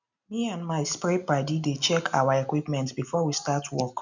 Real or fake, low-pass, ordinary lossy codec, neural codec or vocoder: real; 7.2 kHz; none; none